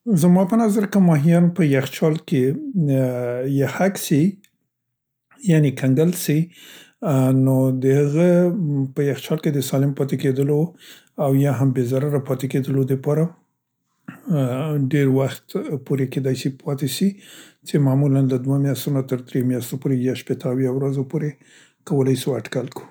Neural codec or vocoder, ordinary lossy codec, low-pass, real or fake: none; none; none; real